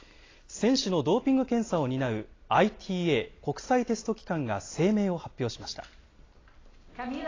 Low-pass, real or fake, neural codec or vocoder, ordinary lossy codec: 7.2 kHz; real; none; AAC, 32 kbps